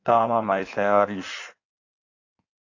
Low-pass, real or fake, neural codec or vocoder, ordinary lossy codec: 7.2 kHz; fake; codec, 16 kHz in and 24 kHz out, 1.1 kbps, FireRedTTS-2 codec; AAC, 48 kbps